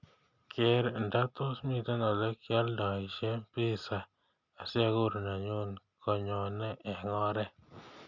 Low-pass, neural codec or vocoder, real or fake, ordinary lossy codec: 7.2 kHz; none; real; none